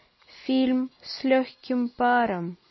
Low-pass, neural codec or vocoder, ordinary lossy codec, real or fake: 7.2 kHz; none; MP3, 24 kbps; real